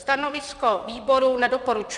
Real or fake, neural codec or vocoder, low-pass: fake; vocoder, 44.1 kHz, 128 mel bands, Pupu-Vocoder; 10.8 kHz